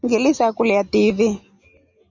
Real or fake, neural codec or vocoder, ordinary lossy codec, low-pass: real; none; Opus, 64 kbps; 7.2 kHz